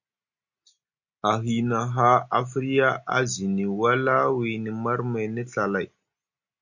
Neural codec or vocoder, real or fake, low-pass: none; real; 7.2 kHz